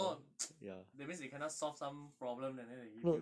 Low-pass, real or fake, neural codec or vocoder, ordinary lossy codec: none; real; none; none